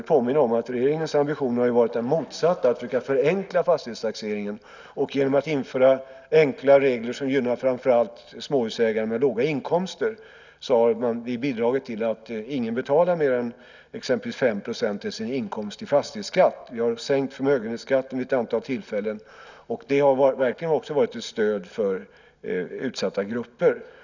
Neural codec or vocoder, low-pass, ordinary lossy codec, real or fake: none; 7.2 kHz; none; real